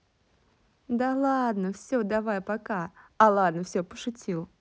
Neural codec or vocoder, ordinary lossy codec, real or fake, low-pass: none; none; real; none